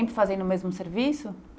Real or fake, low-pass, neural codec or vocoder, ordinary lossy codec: real; none; none; none